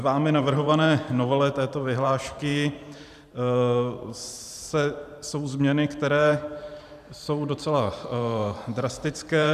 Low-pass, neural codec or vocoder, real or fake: 14.4 kHz; vocoder, 44.1 kHz, 128 mel bands every 512 samples, BigVGAN v2; fake